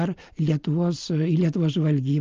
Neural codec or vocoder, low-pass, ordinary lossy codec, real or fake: none; 7.2 kHz; Opus, 24 kbps; real